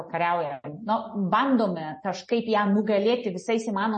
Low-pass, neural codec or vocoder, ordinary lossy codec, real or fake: 10.8 kHz; none; MP3, 32 kbps; real